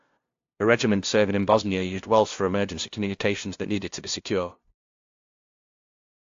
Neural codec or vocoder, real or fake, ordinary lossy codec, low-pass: codec, 16 kHz, 0.5 kbps, FunCodec, trained on LibriTTS, 25 frames a second; fake; AAC, 48 kbps; 7.2 kHz